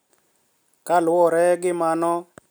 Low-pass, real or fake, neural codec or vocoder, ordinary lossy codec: none; real; none; none